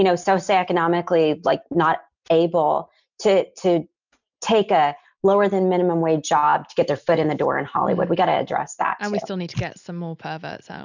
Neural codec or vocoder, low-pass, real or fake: none; 7.2 kHz; real